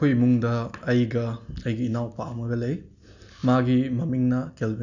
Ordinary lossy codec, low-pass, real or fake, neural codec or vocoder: none; 7.2 kHz; real; none